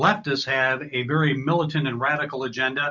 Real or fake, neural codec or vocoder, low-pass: real; none; 7.2 kHz